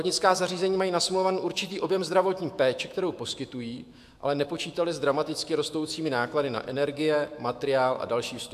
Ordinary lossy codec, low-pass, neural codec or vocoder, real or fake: AAC, 96 kbps; 14.4 kHz; autoencoder, 48 kHz, 128 numbers a frame, DAC-VAE, trained on Japanese speech; fake